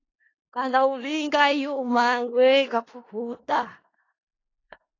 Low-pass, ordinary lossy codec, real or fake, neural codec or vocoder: 7.2 kHz; AAC, 32 kbps; fake; codec, 16 kHz in and 24 kHz out, 0.4 kbps, LongCat-Audio-Codec, four codebook decoder